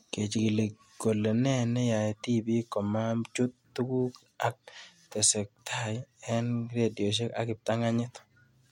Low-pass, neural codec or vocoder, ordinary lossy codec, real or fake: 19.8 kHz; none; MP3, 64 kbps; real